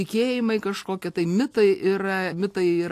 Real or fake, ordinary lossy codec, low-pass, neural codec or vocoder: real; AAC, 64 kbps; 14.4 kHz; none